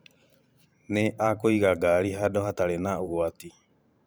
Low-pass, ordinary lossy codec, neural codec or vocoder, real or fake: none; none; vocoder, 44.1 kHz, 128 mel bands every 512 samples, BigVGAN v2; fake